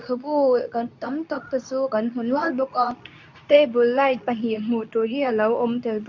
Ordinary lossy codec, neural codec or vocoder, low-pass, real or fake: none; codec, 24 kHz, 0.9 kbps, WavTokenizer, medium speech release version 2; 7.2 kHz; fake